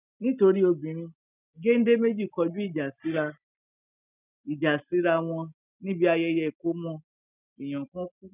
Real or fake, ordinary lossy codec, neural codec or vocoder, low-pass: real; none; none; 3.6 kHz